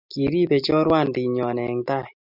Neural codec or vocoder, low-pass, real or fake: none; 5.4 kHz; real